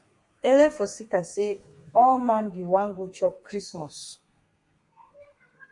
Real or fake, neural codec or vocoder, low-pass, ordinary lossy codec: fake; codec, 32 kHz, 1.9 kbps, SNAC; 10.8 kHz; MP3, 64 kbps